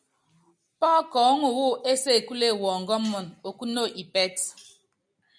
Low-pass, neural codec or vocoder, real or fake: 9.9 kHz; none; real